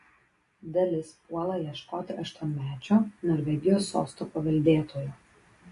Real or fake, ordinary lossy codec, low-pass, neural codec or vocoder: real; AAC, 48 kbps; 10.8 kHz; none